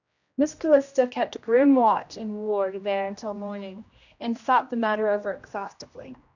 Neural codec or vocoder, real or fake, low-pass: codec, 16 kHz, 1 kbps, X-Codec, HuBERT features, trained on general audio; fake; 7.2 kHz